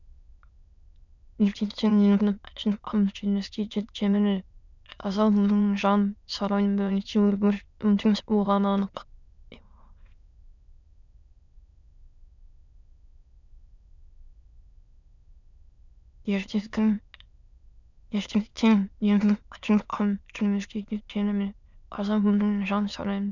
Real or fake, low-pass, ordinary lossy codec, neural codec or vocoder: fake; 7.2 kHz; none; autoencoder, 22.05 kHz, a latent of 192 numbers a frame, VITS, trained on many speakers